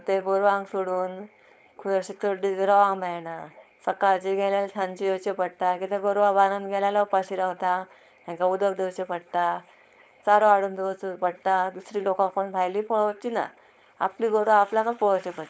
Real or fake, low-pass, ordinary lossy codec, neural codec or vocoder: fake; none; none; codec, 16 kHz, 4.8 kbps, FACodec